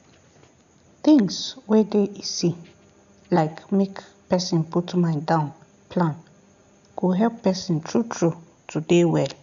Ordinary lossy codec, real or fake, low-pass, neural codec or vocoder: none; real; 7.2 kHz; none